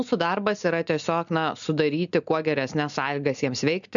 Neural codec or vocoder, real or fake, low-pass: none; real; 7.2 kHz